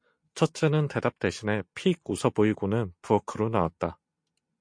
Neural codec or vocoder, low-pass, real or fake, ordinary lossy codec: none; 9.9 kHz; real; MP3, 48 kbps